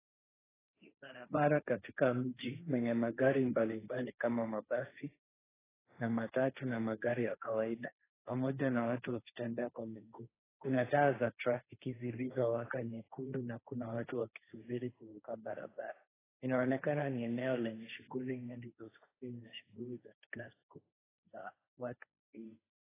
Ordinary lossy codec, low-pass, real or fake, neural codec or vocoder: AAC, 16 kbps; 3.6 kHz; fake; codec, 16 kHz, 1.1 kbps, Voila-Tokenizer